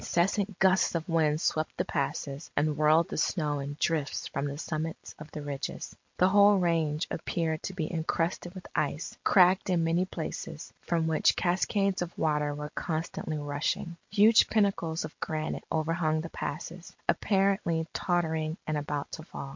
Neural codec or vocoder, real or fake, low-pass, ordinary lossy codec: none; real; 7.2 kHz; MP3, 48 kbps